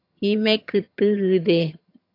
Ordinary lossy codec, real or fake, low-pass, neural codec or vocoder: AAC, 32 kbps; fake; 5.4 kHz; vocoder, 22.05 kHz, 80 mel bands, HiFi-GAN